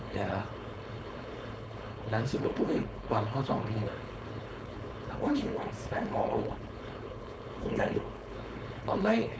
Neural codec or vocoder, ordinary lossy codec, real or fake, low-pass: codec, 16 kHz, 4.8 kbps, FACodec; none; fake; none